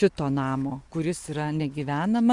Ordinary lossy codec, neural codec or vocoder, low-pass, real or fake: Opus, 64 kbps; none; 10.8 kHz; real